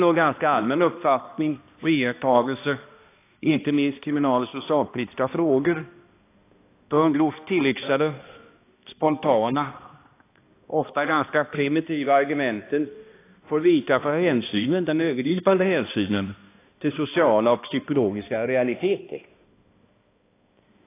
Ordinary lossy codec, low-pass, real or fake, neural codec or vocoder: AAC, 24 kbps; 3.6 kHz; fake; codec, 16 kHz, 1 kbps, X-Codec, HuBERT features, trained on balanced general audio